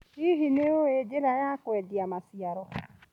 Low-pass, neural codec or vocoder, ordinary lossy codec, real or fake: 19.8 kHz; none; MP3, 96 kbps; real